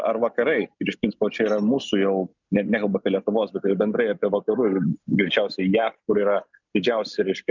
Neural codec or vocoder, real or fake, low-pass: none; real; 7.2 kHz